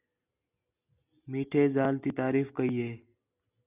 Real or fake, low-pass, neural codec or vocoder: real; 3.6 kHz; none